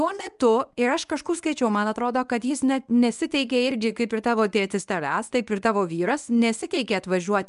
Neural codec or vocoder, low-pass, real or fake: codec, 24 kHz, 0.9 kbps, WavTokenizer, medium speech release version 1; 10.8 kHz; fake